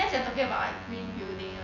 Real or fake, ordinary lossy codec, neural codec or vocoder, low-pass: fake; none; vocoder, 24 kHz, 100 mel bands, Vocos; 7.2 kHz